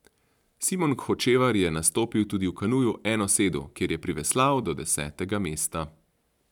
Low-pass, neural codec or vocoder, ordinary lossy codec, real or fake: 19.8 kHz; vocoder, 44.1 kHz, 128 mel bands every 512 samples, BigVGAN v2; none; fake